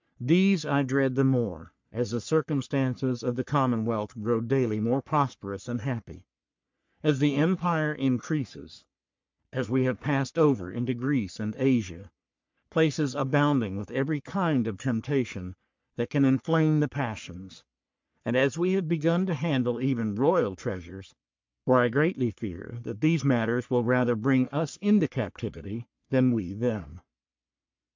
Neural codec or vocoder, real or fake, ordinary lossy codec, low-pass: codec, 44.1 kHz, 3.4 kbps, Pupu-Codec; fake; MP3, 64 kbps; 7.2 kHz